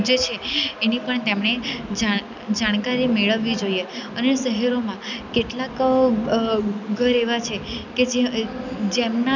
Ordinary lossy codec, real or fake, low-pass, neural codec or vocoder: none; real; 7.2 kHz; none